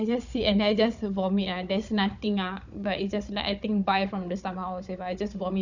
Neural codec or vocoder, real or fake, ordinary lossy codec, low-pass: codec, 16 kHz, 16 kbps, FunCodec, trained on Chinese and English, 50 frames a second; fake; none; 7.2 kHz